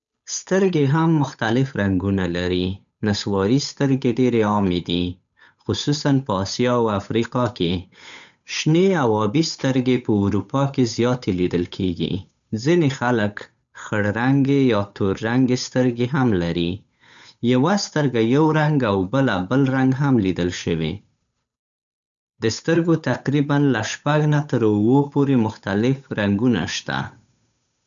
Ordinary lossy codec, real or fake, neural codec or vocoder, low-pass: none; fake; codec, 16 kHz, 8 kbps, FunCodec, trained on Chinese and English, 25 frames a second; 7.2 kHz